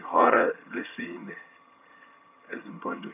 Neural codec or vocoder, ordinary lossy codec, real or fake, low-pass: vocoder, 22.05 kHz, 80 mel bands, HiFi-GAN; none; fake; 3.6 kHz